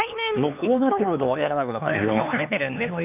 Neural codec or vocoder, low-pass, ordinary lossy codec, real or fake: codec, 16 kHz, 4 kbps, X-Codec, HuBERT features, trained on LibriSpeech; 3.6 kHz; none; fake